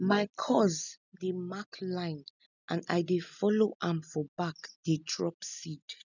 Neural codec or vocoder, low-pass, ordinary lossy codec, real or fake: none; 7.2 kHz; none; real